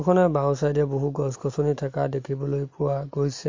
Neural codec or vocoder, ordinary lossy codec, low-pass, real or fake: none; MP3, 48 kbps; 7.2 kHz; real